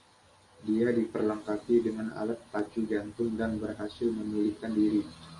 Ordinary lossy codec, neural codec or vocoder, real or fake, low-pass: MP3, 48 kbps; none; real; 10.8 kHz